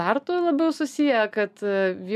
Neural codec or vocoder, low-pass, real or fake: none; 14.4 kHz; real